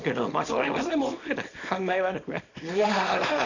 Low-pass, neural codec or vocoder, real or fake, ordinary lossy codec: 7.2 kHz; codec, 24 kHz, 0.9 kbps, WavTokenizer, small release; fake; none